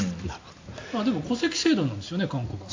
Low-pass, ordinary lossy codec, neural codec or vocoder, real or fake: 7.2 kHz; AAC, 48 kbps; none; real